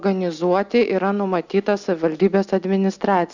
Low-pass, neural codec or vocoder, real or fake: 7.2 kHz; none; real